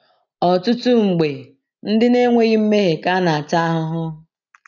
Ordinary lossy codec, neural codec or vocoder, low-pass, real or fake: none; none; 7.2 kHz; real